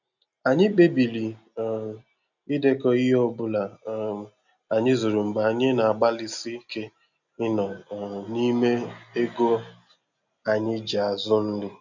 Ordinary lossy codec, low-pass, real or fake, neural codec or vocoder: none; 7.2 kHz; real; none